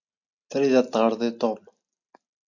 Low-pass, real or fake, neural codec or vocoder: 7.2 kHz; real; none